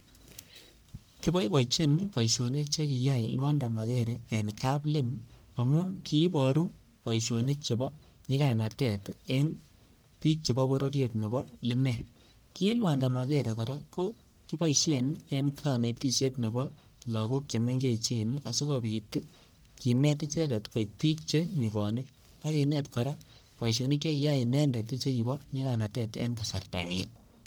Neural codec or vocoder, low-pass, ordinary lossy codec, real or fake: codec, 44.1 kHz, 1.7 kbps, Pupu-Codec; none; none; fake